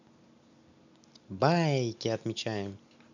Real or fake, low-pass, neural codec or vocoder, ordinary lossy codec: real; 7.2 kHz; none; none